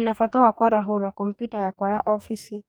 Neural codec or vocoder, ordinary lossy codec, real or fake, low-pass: codec, 44.1 kHz, 2.6 kbps, DAC; none; fake; none